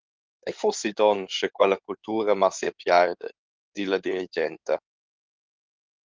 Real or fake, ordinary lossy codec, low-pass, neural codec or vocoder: fake; Opus, 24 kbps; 7.2 kHz; codec, 16 kHz in and 24 kHz out, 2.2 kbps, FireRedTTS-2 codec